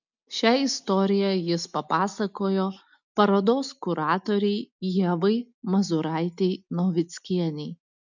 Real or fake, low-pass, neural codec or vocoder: real; 7.2 kHz; none